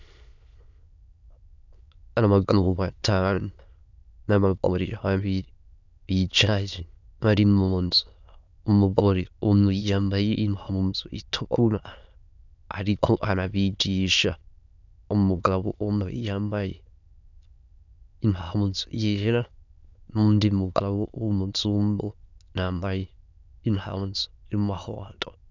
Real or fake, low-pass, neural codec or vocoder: fake; 7.2 kHz; autoencoder, 22.05 kHz, a latent of 192 numbers a frame, VITS, trained on many speakers